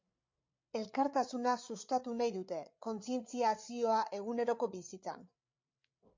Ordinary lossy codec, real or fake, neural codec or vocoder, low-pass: MP3, 48 kbps; fake; codec, 16 kHz, 8 kbps, FreqCodec, larger model; 7.2 kHz